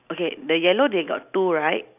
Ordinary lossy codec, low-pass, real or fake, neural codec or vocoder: none; 3.6 kHz; real; none